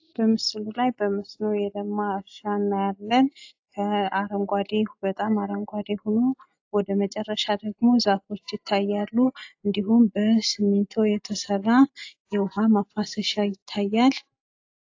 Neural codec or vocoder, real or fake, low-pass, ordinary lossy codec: none; real; 7.2 kHz; AAC, 48 kbps